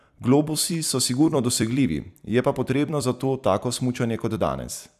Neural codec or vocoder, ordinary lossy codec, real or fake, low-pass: vocoder, 44.1 kHz, 128 mel bands every 256 samples, BigVGAN v2; none; fake; 14.4 kHz